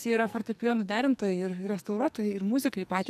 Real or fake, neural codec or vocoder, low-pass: fake; codec, 44.1 kHz, 2.6 kbps, SNAC; 14.4 kHz